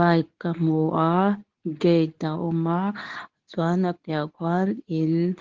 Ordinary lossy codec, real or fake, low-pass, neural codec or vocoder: Opus, 16 kbps; fake; 7.2 kHz; codec, 24 kHz, 0.9 kbps, WavTokenizer, medium speech release version 2